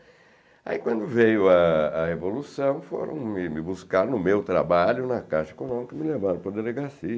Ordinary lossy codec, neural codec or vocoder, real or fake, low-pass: none; none; real; none